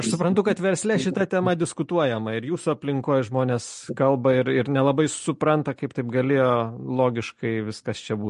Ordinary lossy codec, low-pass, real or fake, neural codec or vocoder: MP3, 48 kbps; 10.8 kHz; real; none